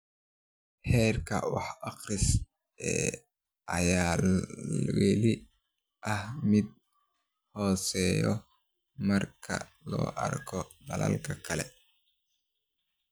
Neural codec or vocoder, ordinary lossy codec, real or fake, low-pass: none; none; real; none